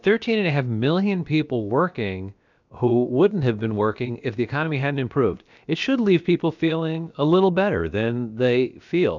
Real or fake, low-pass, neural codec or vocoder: fake; 7.2 kHz; codec, 16 kHz, about 1 kbps, DyCAST, with the encoder's durations